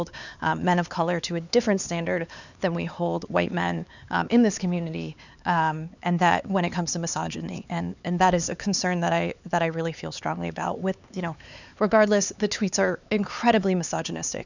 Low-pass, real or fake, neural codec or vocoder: 7.2 kHz; fake; codec, 16 kHz, 4 kbps, X-Codec, HuBERT features, trained on LibriSpeech